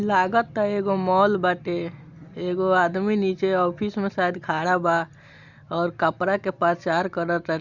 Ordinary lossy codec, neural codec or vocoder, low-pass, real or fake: Opus, 64 kbps; none; 7.2 kHz; real